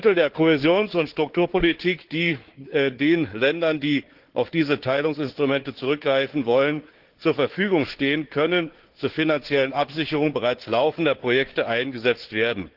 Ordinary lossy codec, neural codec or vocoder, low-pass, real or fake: Opus, 16 kbps; codec, 16 kHz, 4 kbps, FunCodec, trained on Chinese and English, 50 frames a second; 5.4 kHz; fake